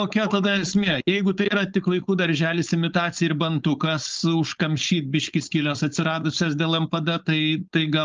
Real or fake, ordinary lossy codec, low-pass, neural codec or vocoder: fake; Opus, 24 kbps; 7.2 kHz; codec, 16 kHz, 4.8 kbps, FACodec